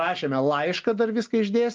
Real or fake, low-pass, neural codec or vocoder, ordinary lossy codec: real; 7.2 kHz; none; Opus, 32 kbps